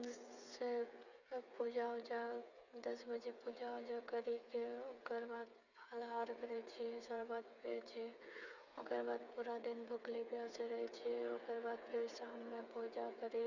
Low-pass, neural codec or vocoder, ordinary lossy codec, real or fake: 7.2 kHz; codec, 16 kHz, 8 kbps, FreqCodec, smaller model; none; fake